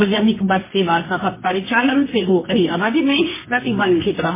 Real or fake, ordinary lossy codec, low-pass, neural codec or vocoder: fake; MP3, 16 kbps; 3.6 kHz; codec, 24 kHz, 0.9 kbps, WavTokenizer, medium music audio release